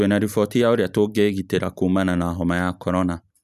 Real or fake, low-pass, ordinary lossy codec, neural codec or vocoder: real; 14.4 kHz; none; none